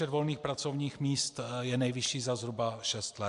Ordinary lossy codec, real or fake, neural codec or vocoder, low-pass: AAC, 64 kbps; real; none; 10.8 kHz